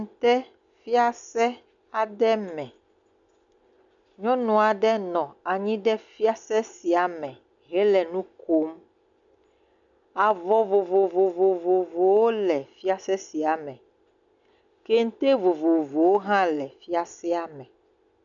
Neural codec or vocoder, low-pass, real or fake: none; 7.2 kHz; real